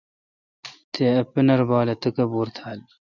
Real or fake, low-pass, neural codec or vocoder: real; 7.2 kHz; none